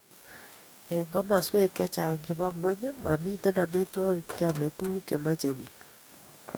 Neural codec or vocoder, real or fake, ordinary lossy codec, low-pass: codec, 44.1 kHz, 2.6 kbps, DAC; fake; none; none